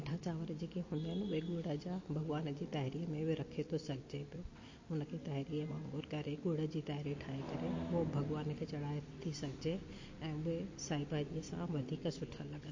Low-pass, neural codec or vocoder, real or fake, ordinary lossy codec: 7.2 kHz; none; real; MP3, 32 kbps